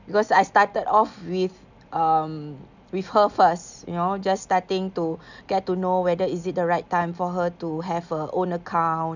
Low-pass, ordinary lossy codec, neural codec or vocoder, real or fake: 7.2 kHz; none; none; real